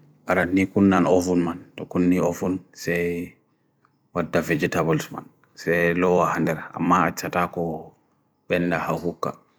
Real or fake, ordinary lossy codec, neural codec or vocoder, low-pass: fake; none; vocoder, 44.1 kHz, 128 mel bands every 256 samples, BigVGAN v2; none